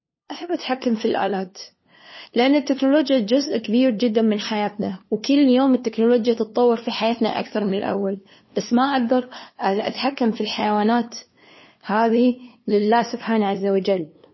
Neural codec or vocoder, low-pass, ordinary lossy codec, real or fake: codec, 16 kHz, 2 kbps, FunCodec, trained on LibriTTS, 25 frames a second; 7.2 kHz; MP3, 24 kbps; fake